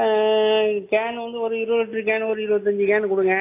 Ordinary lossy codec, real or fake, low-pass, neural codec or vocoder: AAC, 24 kbps; real; 3.6 kHz; none